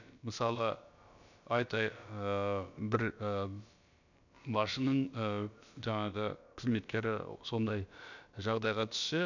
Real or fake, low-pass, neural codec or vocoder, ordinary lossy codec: fake; 7.2 kHz; codec, 16 kHz, about 1 kbps, DyCAST, with the encoder's durations; none